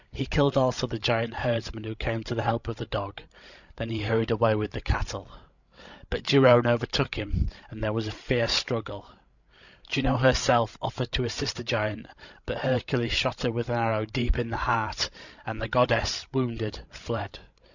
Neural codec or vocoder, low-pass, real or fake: codec, 16 kHz, 16 kbps, FreqCodec, larger model; 7.2 kHz; fake